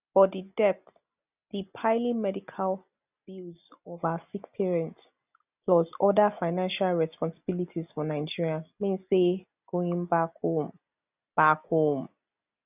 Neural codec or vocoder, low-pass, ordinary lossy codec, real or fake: none; 3.6 kHz; none; real